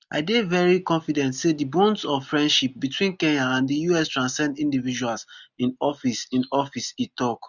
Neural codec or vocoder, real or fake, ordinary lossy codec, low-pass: none; real; none; 7.2 kHz